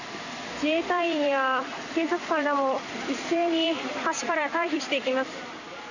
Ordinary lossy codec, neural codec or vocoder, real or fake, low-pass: none; vocoder, 44.1 kHz, 128 mel bands every 256 samples, BigVGAN v2; fake; 7.2 kHz